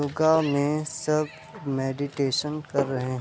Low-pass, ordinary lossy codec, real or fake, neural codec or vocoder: none; none; real; none